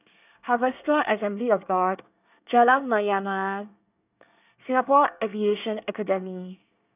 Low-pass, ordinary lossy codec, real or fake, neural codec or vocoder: 3.6 kHz; none; fake; codec, 24 kHz, 1 kbps, SNAC